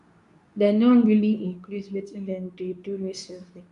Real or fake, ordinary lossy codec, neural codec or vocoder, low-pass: fake; none; codec, 24 kHz, 0.9 kbps, WavTokenizer, medium speech release version 2; 10.8 kHz